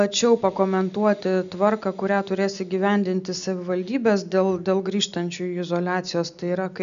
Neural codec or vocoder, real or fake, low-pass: none; real; 7.2 kHz